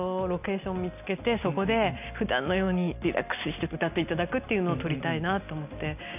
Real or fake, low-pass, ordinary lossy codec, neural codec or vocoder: real; 3.6 kHz; none; none